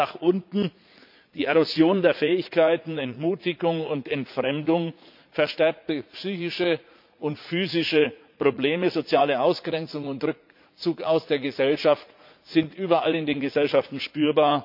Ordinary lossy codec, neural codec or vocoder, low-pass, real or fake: MP3, 48 kbps; vocoder, 44.1 kHz, 80 mel bands, Vocos; 5.4 kHz; fake